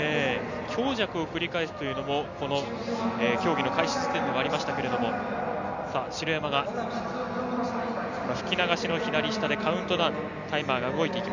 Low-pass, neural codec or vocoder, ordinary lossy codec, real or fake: 7.2 kHz; none; none; real